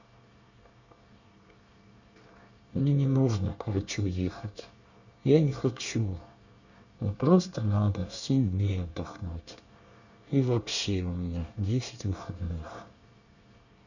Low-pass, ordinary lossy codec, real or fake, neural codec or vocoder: 7.2 kHz; none; fake; codec, 24 kHz, 1 kbps, SNAC